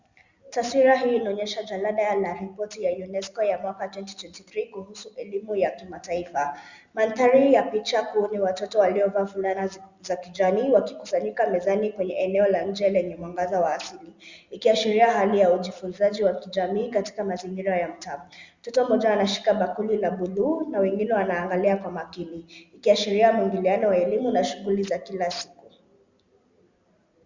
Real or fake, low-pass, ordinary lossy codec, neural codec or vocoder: real; 7.2 kHz; Opus, 64 kbps; none